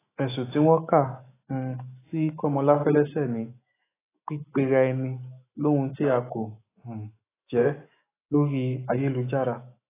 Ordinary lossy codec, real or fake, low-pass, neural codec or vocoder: AAC, 16 kbps; fake; 3.6 kHz; autoencoder, 48 kHz, 128 numbers a frame, DAC-VAE, trained on Japanese speech